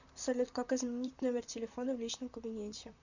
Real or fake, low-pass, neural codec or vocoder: fake; 7.2 kHz; vocoder, 44.1 kHz, 128 mel bands, Pupu-Vocoder